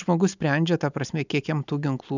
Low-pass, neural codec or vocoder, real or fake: 7.2 kHz; none; real